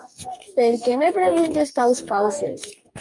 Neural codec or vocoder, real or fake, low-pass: codec, 44.1 kHz, 2.6 kbps, DAC; fake; 10.8 kHz